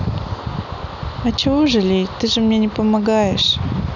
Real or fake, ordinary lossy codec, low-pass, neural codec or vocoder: real; none; 7.2 kHz; none